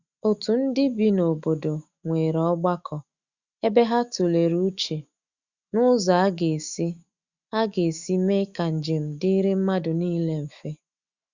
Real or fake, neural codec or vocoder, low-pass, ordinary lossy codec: fake; autoencoder, 48 kHz, 128 numbers a frame, DAC-VAE, trained on Japanese speech; 7.2 kHz; Opus, 64 kbps